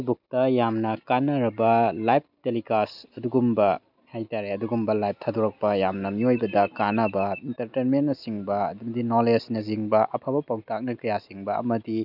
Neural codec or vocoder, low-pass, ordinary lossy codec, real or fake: none; 5.4 kHz; none; real